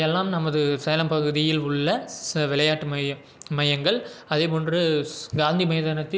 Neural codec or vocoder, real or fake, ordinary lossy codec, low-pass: none; real; none; none